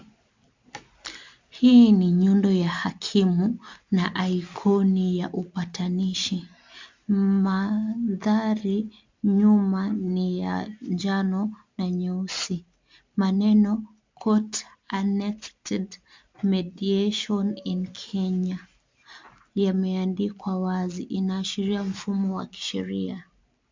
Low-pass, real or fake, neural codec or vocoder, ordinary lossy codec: 7.2 kHz; real; none; MP3, 64 kbps